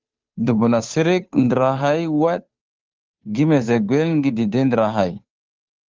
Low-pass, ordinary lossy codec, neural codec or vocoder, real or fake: 7.2 kHz; Opus, 24 kbps; codec, 16 kHz, 2 kbps, FunCodec, trained on Chinese and English, 25 frames a second; fake